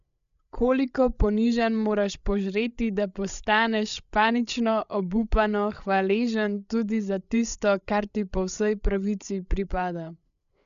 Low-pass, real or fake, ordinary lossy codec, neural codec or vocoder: 7.2 kHz; fake; none; codec, 16 kHz, 8 kbps, FreqCodec, larger model